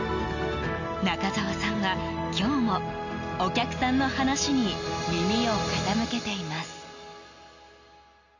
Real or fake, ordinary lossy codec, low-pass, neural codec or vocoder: real; none; 7.2 kHz; none